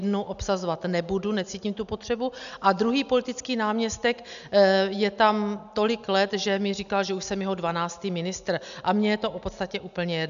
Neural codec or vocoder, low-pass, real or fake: none; 7.2 kHz; real